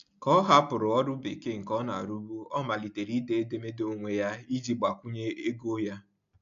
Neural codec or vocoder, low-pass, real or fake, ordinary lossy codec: none; 7.2 kHz; real; AAC, 64 kbps